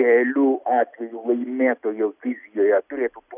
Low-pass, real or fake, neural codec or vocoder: 3.6 kHz; real; none